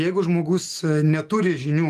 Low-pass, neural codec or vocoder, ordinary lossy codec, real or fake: 14.4 kHz; autoencoder, 48 kHz, 128 numbers a frame, DAC-VAE, trained on Japanese speech; Opus, 24 kbps; fake